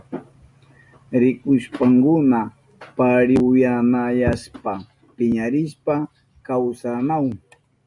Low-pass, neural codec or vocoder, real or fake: 10.8 kHz; none; real